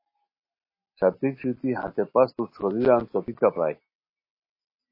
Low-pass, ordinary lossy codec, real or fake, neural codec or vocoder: 5.4 kHz; MP3, 24 kbps; real; none